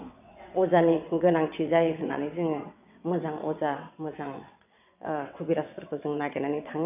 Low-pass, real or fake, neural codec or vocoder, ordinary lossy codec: 3.6 kHz; fake; vocoder, 22.05 kHz, 80 mel bands, WaveNeXt; none